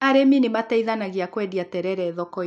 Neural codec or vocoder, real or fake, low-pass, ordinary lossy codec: none; real; none; none